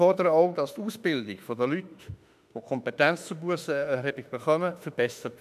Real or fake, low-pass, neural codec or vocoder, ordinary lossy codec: fake; 14.4 kHz; autoencoder, 48 kHz, 32 numbers a frame, DAC-VAE, trained on Japanese speech; none